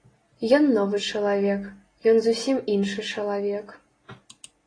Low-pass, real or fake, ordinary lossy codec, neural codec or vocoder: 9.9 kHz; real; AAC, 32 kbps; none